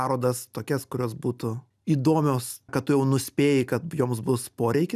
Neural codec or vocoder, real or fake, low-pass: none; real; 14.4 kHz